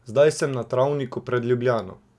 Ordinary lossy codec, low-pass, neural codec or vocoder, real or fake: none; none; none; real